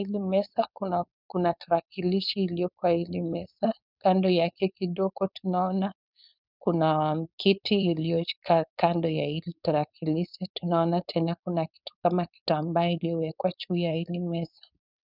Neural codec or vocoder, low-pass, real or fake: codec, 16 kHz, 4.8 kbps, FACodec; 5.4 kHz; fake